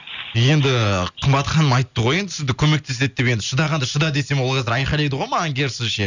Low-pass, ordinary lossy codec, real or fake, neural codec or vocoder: 7.2 kHz; none; real; none